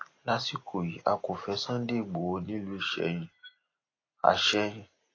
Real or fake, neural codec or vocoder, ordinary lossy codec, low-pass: real; none; AAC, 32 kbps; 7.2 kHz